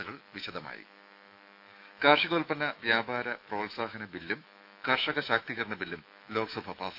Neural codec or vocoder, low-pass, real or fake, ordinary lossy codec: autoencoder, 48 kHz, 128 numbers a frame, DAC-VAE, trained on Japanese speech; 5.4 kHz; fake; AAC, 48 kbps